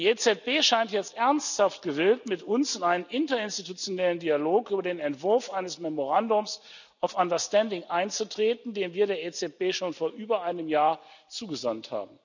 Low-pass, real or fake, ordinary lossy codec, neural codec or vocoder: 7.2 kHz; real; none; none